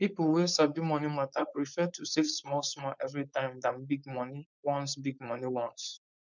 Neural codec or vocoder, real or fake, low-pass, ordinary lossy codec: codec, 16 kHz, 4.8 kbps, FACodec; fake; 7.2 kHz; none